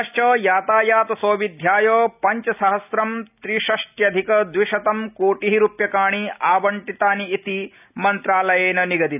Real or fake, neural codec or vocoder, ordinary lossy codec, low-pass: real; none; none; 3.6 kHz